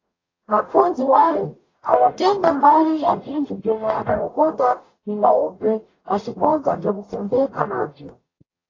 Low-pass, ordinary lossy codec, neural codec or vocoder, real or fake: 7.2 kHz; AAC, 32 kbps; codec, 44.1 kHz, 0.9 kbps, DAC; fake